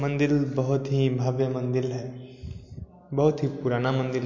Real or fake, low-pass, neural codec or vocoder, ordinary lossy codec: real; 7.2 kHz; none; MP3, 48 kbps